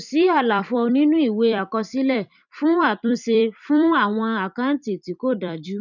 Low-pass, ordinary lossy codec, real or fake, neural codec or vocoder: 7.2 kHz; none; fake; vocoder, 44.1 kHz, 128 mel bands every 256 samples, BigVGAN v2